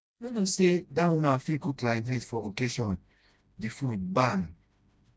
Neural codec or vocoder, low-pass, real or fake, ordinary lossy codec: codec, 16 kHz, 1 kbps, FreqCodec, smaller model; none; fake; none